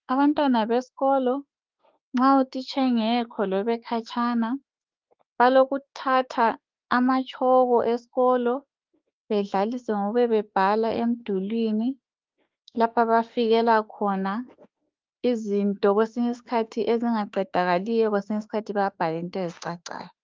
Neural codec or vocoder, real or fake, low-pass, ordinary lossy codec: autoencoder, 48 kHz, 32 numbers a frame, DAC-VAE, trained on Japanese speech; fake; 7.2 kHz; Opus, 32 kbps